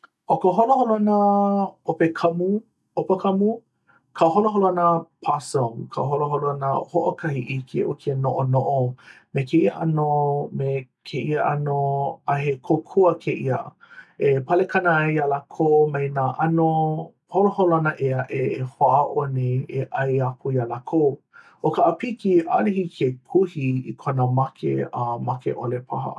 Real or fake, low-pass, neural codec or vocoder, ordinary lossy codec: real; none; none; none